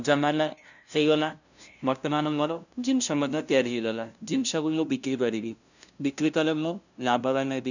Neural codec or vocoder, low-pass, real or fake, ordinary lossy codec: codec, 16 kHz, 0.5 kbps, FunCodec, trained on LibriTTS, 25 frames a second; 7.2 kHz; fake; none